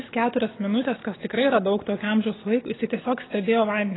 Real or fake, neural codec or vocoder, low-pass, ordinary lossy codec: real; none; 7.2 kHz; AAC, 16 kbps